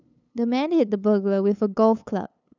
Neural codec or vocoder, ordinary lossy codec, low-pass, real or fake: codec, 16 kHz, 8 kbps, FunCodec, trained on LibriTTS, 25 frames a second; none; 7.2 kHz; fake